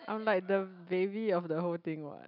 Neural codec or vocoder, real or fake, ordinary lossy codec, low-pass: none; real; none; 5.4 kHz